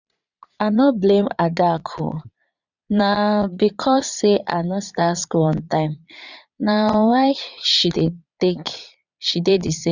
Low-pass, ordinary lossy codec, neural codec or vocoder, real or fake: 7.2 kHz; none; vocoder, 22.05 kHz, 80 mel bands, Vocos; fake